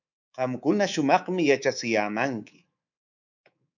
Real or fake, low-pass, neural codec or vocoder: fake; 7.2 kHz; codec, 24 kHz, 3.1 kbps, DualCodec